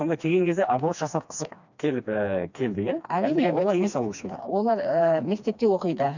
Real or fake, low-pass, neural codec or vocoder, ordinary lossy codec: fake; 7.2 kHz; codec, 16 kHz, 2 kbps, FreqCodec, smaller model; none